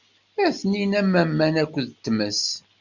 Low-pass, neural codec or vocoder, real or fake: 7.2 kHz; none; real